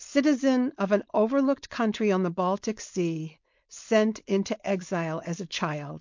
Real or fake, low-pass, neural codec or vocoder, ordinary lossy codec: fake; 7.2 kHz; codec, 16 kHz, 4.8 kbps, FACodec; MP3, 48 kbps